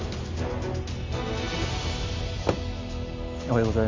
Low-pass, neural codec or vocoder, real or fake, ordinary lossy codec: 7.2 kHz; none; real; MP3, 48 kbps